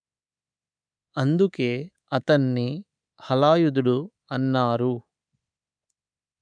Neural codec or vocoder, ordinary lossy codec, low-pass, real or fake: codec, 24 kHz, 3.1 kbps, DualCodec; none; 9.9 kHz; fake